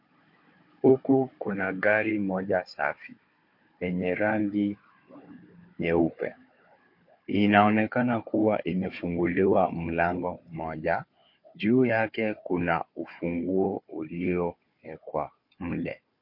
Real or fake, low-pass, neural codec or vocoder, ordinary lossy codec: fake; 5.4 kHz; codec, 16 kHz, 4 kbps, FunCodec, trained on Chinese and English, 50 frames a second; MP3, 32 kbps